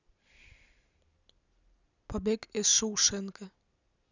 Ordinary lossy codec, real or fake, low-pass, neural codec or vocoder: none; real; 7.2 kHz; none